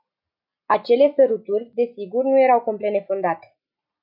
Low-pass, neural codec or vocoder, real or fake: 5.4 kHz; none; real